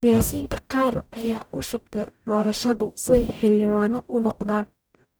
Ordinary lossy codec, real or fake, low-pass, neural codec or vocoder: none; fake; none; codec, 44.1 kHz, 0.9 kbps, DAC